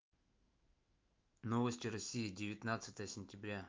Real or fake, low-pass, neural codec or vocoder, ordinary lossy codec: fake; 7.2 kHz; autoencoder, 48 kHz, 128 numbers a frame, DAC-VAE, trained on Japanese speech; Opus, 24 kbps